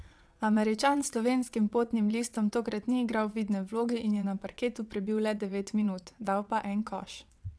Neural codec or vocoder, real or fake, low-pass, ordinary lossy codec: vocoder, 22.05 kHz, 80 mel bands, WaveNeXt; fake; none; none